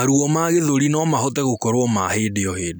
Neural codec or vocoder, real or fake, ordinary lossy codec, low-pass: none; real; none; none